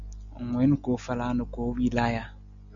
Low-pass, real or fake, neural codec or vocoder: 7.2 kHz; real; none